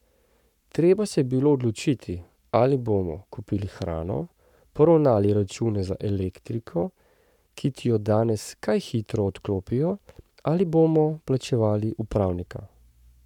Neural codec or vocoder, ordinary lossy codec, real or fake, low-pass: codec, 44.1 kHz, 7.8 kbps, Pupu-Codec; none; fake; 19.8 kHz